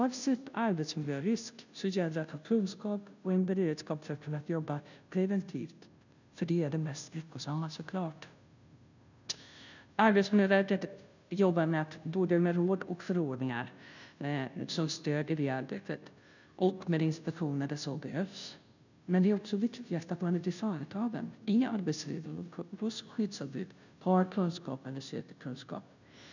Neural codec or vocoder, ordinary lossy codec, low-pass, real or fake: codec, 16 kHz, 0.5 kbps, FunCodec, trained on Chinese and English, 25 frames a second; none; 7.2 kHz; fake